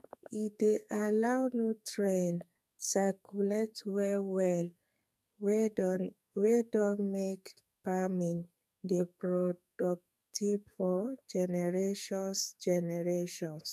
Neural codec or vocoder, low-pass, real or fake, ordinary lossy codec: codec, 44.1 kHz, 2.6 kbps, SNAC; 14.4 kHz; fake; none